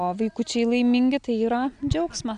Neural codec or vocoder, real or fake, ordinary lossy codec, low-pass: none; real; AAC, 96 kbps; 9.9 kHz